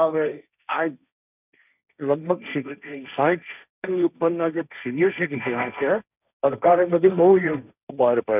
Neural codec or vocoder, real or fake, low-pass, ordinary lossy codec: codec, 16 kHz, 1.1 kbps, Voila-Tokenizer; fake; 3.6 kHz; none